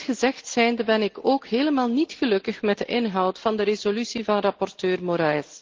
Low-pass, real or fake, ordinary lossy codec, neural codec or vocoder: 7.2 kHz; real; Opus, 16 kbps; none